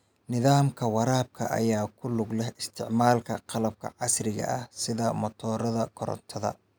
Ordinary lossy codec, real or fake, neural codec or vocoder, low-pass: none; real; none; none